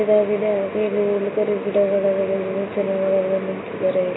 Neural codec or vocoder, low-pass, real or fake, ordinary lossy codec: none; 7.2 kHz; real; AAC, 16 kbps